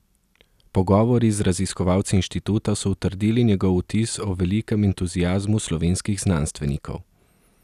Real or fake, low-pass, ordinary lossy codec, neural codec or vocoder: real; 14.4 kHz; none; none